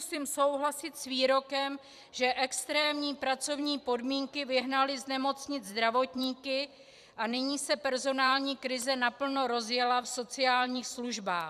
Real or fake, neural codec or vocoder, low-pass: fake; vocoder, 44.1 kHz, 128 mel bands every 256 samples, BigVGAN v2; 14.4 kHz